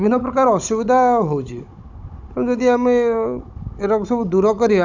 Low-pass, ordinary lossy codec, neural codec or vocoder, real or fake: 7.2 kHz; none; codec, 16 kHz, 16 kbps, FunCodec, trained on Chinese and English, 50 frames a second; fake